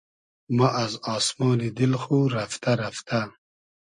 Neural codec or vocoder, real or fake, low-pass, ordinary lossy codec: none; real; 9.9 kHz; MP3, 48 kbps